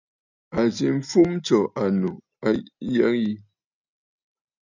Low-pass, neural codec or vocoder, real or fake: 7.2 kHz; none; real